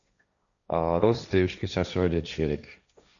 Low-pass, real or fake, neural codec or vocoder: 7.2 kHz; fake; codec, 16 kHz, 1.1 kbps, Voila-Tokenizer